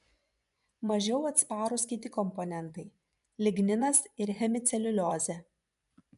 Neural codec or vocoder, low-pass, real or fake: vocoder, 24 kHz, 100 mel bands, Vocos; 10.8 kHz; fake